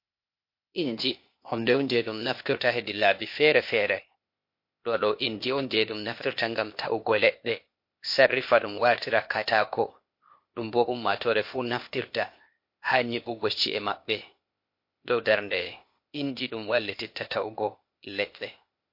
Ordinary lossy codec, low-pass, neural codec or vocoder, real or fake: MP3, 32 kbps; 5.4 kHz; codec, 16 kHz, 0.8 kbps, ZipCodec; fake